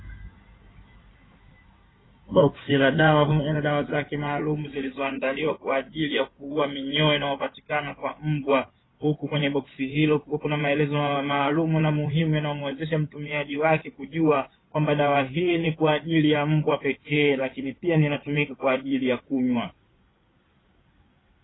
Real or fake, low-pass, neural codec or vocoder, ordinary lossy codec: fake; 7.2 kHz; codec, 16 kHz in and 24 kHz out, 2.2 kbps, FireRedTTS-2 codec; AAC, 16 kbps